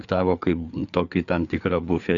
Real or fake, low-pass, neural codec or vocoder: fake; 7.2 kHz; codec, 16 kHz, 4 kbps, FreqCodec, larger model